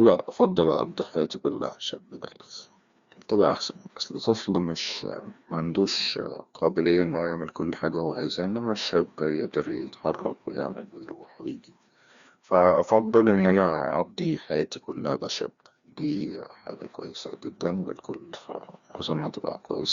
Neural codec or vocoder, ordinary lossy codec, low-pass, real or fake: codec, 16 kHz, 1 kbps, FreqCodec, larger model; Opus, 64 kbps; 7.2 kHz; fake